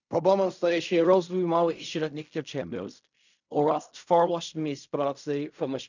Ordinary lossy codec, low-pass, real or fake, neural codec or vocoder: none; 7.2 kHz; fake; codec, 16 kHz in and 24 kHz out, 0.4 kbps, LongCat-Audio-Codec, fine tuned four codebook decoder